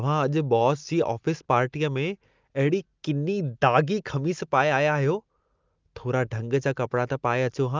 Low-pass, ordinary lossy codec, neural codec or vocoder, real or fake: 7.2 kHz; Opus, 32 kbps; none; real